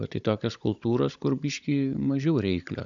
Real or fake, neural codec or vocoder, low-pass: fake; codec, 16 kHz, 16 kbps, FunCodec, trained on Chinese and English, 50 frames a second; 7.2 kHz